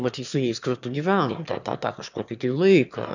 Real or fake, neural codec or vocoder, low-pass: fake; autoencoder, 22.05 kHz, a latent of 192 numbers a frame, VITS, trained on one speaker; 7.2 kHz